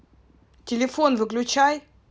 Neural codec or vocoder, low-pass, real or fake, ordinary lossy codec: none; none; real; none